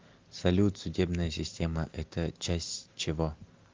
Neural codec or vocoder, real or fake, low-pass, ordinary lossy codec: none; real; 7.2 kHz; Opus, 32 kbps